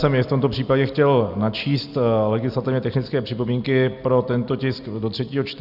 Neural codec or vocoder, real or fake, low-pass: none; real; 5.4 kHz